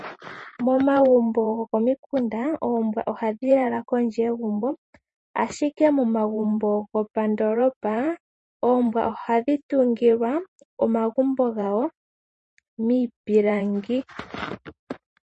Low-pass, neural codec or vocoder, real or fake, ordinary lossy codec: 10.8 kHz; vocoder, 44.1 kHz, 128 mel bands every 512 samples, BigVGAN v2; fake; MP3, 32 kbps